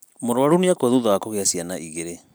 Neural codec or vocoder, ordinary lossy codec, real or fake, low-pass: none; none; real; none